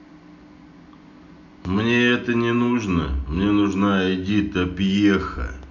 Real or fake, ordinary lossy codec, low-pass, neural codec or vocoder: real; none; 7.2 kHz; none